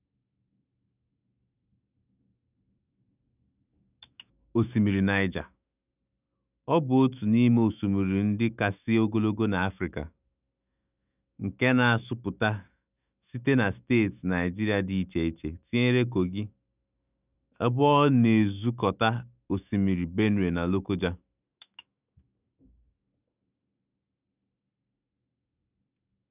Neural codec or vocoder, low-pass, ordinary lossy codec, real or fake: autoencoder, 48 kHz, 128 numbers a frame, DAC-VAE, trained on Japanese speech; 3.6 kHz; none; fake